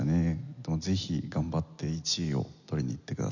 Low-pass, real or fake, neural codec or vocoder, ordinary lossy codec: 7.2 kHz; real; none; none